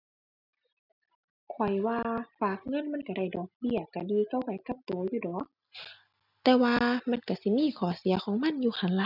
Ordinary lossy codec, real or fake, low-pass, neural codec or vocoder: none; real; 5.4 kHz; none